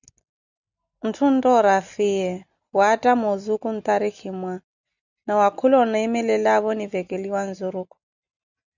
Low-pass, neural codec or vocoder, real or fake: 7.2 kHz; none; real